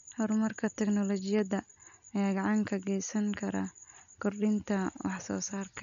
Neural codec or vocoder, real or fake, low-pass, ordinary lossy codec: none; real; 7.2 kHz; none